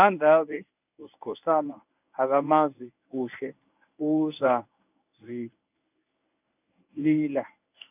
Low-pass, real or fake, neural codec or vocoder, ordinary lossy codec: 3.6 kHz; fake; codec, 24 kHz, 0.9 kbps, WavTokenizer, medium speech release version 1; none